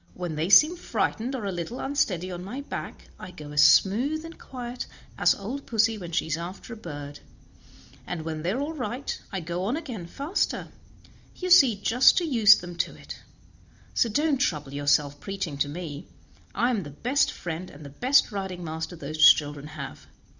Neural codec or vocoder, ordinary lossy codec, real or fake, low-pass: none; Opus, 64 kbps; real; 7.2 kHz